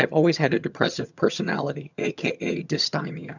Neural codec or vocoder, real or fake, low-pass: vocoder, 22.05 kHz, 80 mel bands, HiFi-GAN; fake; 7.2 kHz